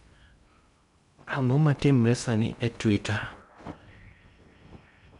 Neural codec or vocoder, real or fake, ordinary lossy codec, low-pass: codec, 16 kHz in and 24 kHz out, 0.6 kbps, FocalCodec, streaming, 2048 codes; fake; MP3, 96 kbps; 10.8 kHz